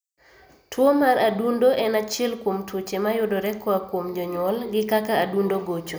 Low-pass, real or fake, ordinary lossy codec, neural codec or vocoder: none; real; none; none